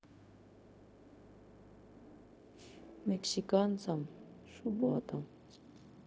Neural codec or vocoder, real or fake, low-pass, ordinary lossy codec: codec, 16 kHz, 0.4 kbps, LongCat-Audio-Codec; fake; none; none